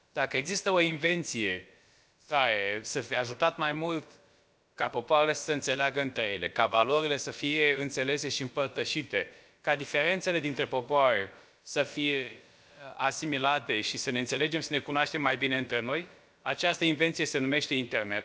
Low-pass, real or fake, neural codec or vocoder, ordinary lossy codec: none; fake; codec, 16 kHz, about 1 kbps, DyCAST, with the encoder's durations; none